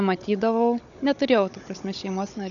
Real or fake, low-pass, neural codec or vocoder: fake; 7.2 kHz; codec, 16 kHz, 16 kbps, FunCodec, trained on Chinese and English, 50 frames a second